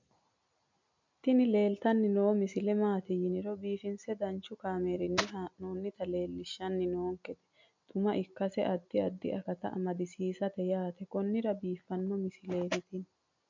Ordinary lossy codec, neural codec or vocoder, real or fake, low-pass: MP3, 64 kbps; none; real; 7.2 kHz